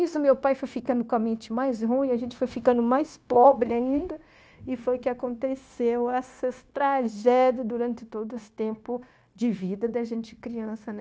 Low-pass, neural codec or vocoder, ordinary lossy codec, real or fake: none; codec, 16 kHz, 0.9 kbps, LongCat-Audio-Codec; none; fake